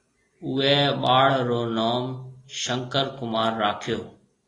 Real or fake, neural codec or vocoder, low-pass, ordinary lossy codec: real; none; 10.8 kHz; AAC, 32 kbps